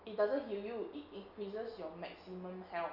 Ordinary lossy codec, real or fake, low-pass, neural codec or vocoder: none; real; 5.4 kHz; none